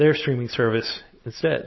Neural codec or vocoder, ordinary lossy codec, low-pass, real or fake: none; MP3, 24 kbps; 7.2 kHz; real